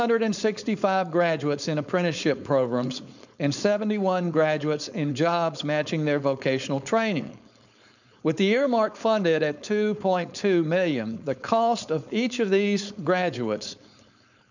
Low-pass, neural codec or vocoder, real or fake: 7.2 kHz; codec, 16 kHz, 4.8 kbps, FACodec; fake